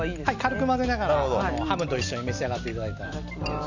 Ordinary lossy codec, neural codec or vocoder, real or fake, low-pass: none; none; real; 7.2 kHz